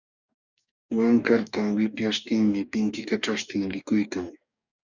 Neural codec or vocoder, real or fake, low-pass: codec, 44.1 kHz, 2.6 kbps, DAC; fake; 7.2 kHz